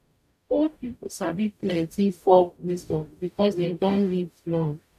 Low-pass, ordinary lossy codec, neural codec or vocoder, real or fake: 14.4 kHz; none; codec, 44.1 kHz, 0.9 kbps, DAC; fake